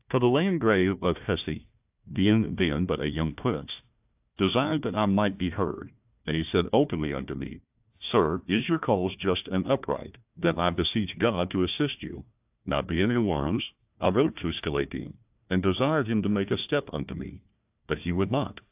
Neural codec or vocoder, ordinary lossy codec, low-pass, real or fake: codec, 16 kHz, 1 kbps, FunCodec, trained on Chinese and English, 50 frames a second; AAC, 32 kbps; 3.6 kHz; fake